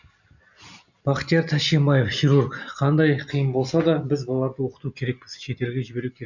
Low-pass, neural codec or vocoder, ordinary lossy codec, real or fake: 7.2 kHz; none; none; real